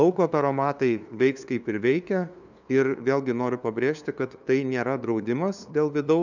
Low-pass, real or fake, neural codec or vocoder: 7.2 kHz; fake; codec, 16 kHz, 2 kbps, FunCodec, trained on LibriTTS, 25 frames a second